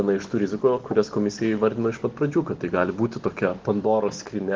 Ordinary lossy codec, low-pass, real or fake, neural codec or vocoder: Opus, 16 kbps; 7.2 kHz; real; none